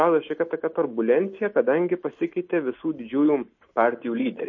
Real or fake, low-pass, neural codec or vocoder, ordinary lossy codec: real; 7.2 kHz; none; MP3, 32 kbps